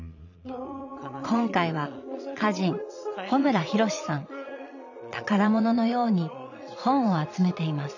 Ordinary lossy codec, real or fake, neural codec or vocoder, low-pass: none; fake; vocoder, 22.05 kHz, 80 mel bands, Vocos; 7.2 kHz